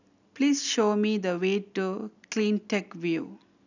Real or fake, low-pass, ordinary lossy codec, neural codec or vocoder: real; 7.2 kHz; none; none